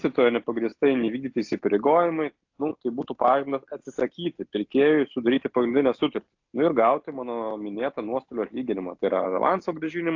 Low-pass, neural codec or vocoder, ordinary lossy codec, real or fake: 7.2 kHz; none; AAC, 48 kbps; real